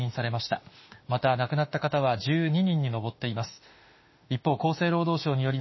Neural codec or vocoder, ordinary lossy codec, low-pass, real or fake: autoencoder, 48 kHz, 32 numbers a frame, DAC-VAE, trained on Japanese speech; MP3, 24 kbps; 7.2 kHz; fake